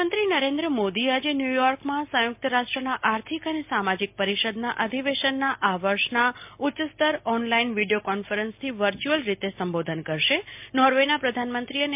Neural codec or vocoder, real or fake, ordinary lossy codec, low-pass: none; real; MP3, 32 kbps; 3.6 kHz